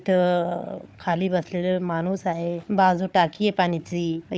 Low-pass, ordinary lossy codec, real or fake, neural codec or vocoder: none; none; fake; codec, 16 kHz, 4 kbps, FunCodec, trained on Chinese and English, 50 frames a second